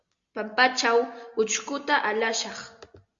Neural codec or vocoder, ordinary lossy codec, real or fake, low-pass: none; Opus, 64 kbps; real; 7.2 kHz